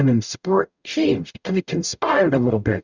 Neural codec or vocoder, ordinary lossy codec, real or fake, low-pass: codec, 44.1 kHz, 0.9 kbps, DAC; Opus, 64 kbps; fake; 7.2 kHz